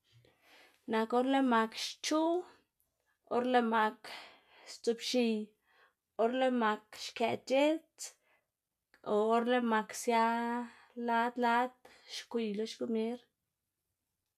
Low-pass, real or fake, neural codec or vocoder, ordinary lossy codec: 14.4 kHz; real; none; none